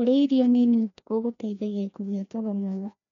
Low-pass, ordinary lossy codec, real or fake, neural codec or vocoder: 7.2 kHz; none; fake; codec, 16 kHz, 1 kbps, FreqCodec, larger model